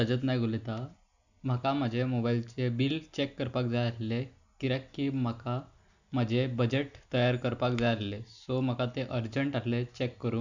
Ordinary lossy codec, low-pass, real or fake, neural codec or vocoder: none; 7.2 kHz; real; none